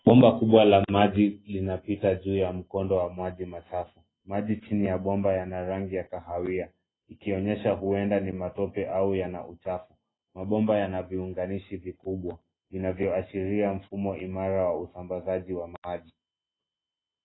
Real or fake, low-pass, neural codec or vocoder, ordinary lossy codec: real; 7.2 kHz; none; AAC, 16 kbps